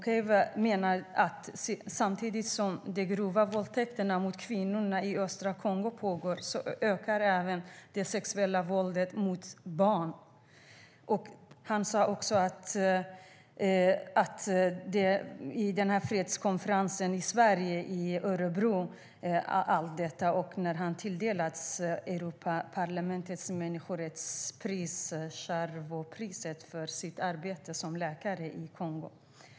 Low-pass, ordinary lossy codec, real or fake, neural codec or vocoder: none; none; real; none